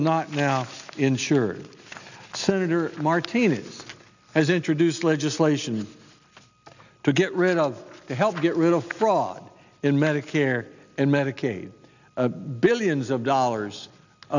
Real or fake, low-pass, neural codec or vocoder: real; 7.2 kHz; none